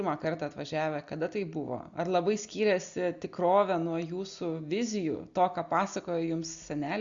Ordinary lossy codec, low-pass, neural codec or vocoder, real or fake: Opus, 64 kbps; 7.2 kHz; none; real